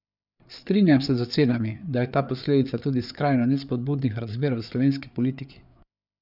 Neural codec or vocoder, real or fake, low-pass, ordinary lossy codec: codec, 16 kHz, 4 kbps, FreqCodec, larger model; fake; 5.4 kHz; none